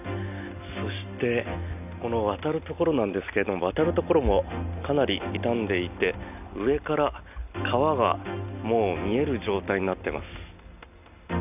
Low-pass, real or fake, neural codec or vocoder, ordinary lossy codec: 3.6 kHz; real; none; none